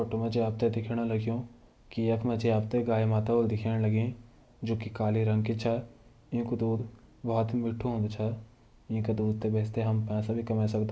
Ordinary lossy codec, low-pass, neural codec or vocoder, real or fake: none; none; none; real